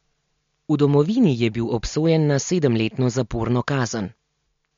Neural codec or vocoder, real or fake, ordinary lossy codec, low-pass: none; real; MP3, 48 kbps; 7.2 kHz